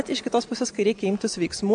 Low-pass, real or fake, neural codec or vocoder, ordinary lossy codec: 9.9 kHz; real; none; AAC, 64 kbps